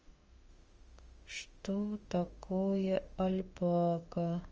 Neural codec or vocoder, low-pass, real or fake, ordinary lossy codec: autoencoder, 48 kHz, 32 numbers a frame, DAC-VAE, trained on Japanese speech; 7.2 kHz; fake; Opus, 24 kbps